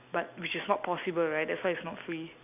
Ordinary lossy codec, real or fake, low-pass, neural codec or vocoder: none; real; 3.6 kHz; none